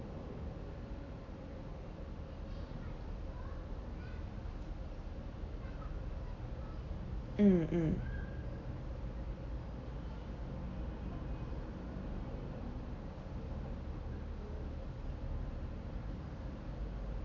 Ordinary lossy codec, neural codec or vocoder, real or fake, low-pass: none; none; real; 7.2 kHz